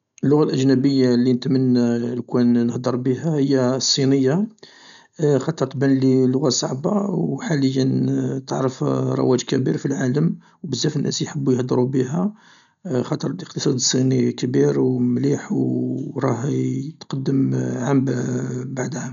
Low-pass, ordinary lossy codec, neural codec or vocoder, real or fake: 7.2 kHz; none; none; real